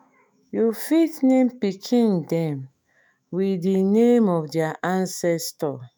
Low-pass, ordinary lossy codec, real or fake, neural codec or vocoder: none; none; fake; autoencoder, 48 kHz, 128 numbers a frame, DAC-VAE, trained on Japanese speech